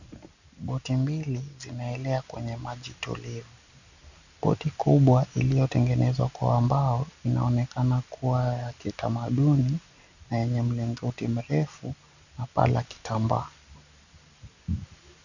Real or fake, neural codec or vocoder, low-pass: real; none; 7.2 kHz